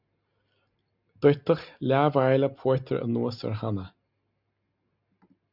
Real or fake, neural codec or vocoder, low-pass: real; none; 5.4 kHz